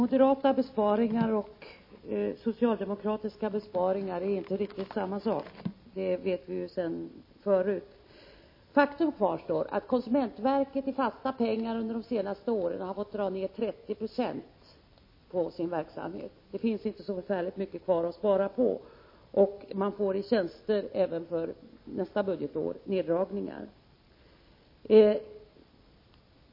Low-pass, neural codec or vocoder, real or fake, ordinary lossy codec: 5.4 kHz; none; real; MP3, 24 kbps